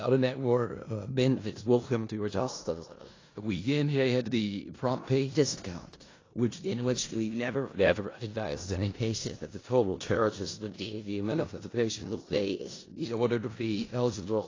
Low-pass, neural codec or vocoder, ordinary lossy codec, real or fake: 7.2 kHz; codec, 16 kHz in and 24 kHz out, 0.4 kbps, LongCat-Audio-Codec, four codebook decoder; AAC, 32 kbps; fake